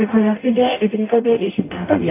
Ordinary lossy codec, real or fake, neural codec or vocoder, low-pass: AAC, 24 kbps; fake; codec, 44.1 kHz, 0.9 kbps, DAC; 3.6 kHz